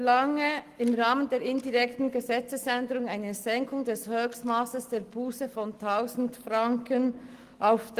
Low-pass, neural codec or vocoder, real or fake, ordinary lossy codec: 14.4 kHz; none; real; Opus, 24 kbps